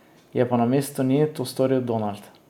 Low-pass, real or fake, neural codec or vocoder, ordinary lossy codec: 19.8 kHz; real; none; none